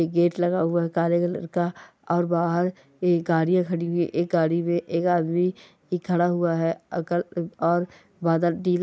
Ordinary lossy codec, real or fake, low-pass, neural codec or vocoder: none; real; none; none